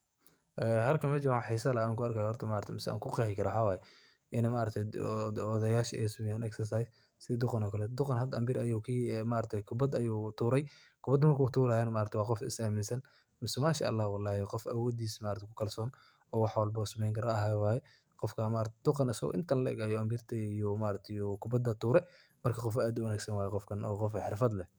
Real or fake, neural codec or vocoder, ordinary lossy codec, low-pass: fake; codec, 44.1 kHz, 7.8 kbps, DAC; none; none